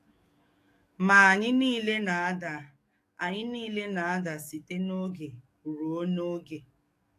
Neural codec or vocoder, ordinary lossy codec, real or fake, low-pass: codec, 44.1 kHz, 7.8 kbps, DAC; AAC, 64 kbps; fake; 14.4 kHz